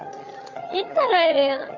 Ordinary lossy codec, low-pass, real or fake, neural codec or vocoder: none; 7.2 kHz; fake; vocoder, 22.05 kHz, 80 mel bands, HiFi-GAN